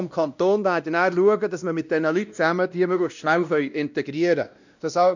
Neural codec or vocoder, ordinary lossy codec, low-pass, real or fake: codec, 16 kHz, 1 kbps, X-Codec, WavLM features, trained on Multilingual LibriSpeech; none; 7.2 kHz; fake